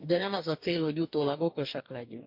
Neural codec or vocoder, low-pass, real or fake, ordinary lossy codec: codec, 44.1 kHz, 2.6 kbps, DAC; 5.4 kHz; fake; MP3, 48 kbps